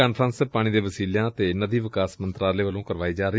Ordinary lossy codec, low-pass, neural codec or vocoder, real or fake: none; none; none; real